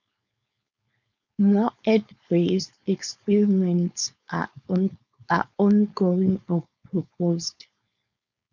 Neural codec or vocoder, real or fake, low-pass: codec, 16 kHz, 4.8 kbps, FACodec; fake; 7.2 kHz